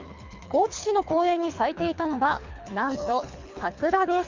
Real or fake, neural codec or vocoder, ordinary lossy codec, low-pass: fake; codec, 24 kHz, 3 kbps, HILCodec; MP3, 64 kbps; 7.2 kHz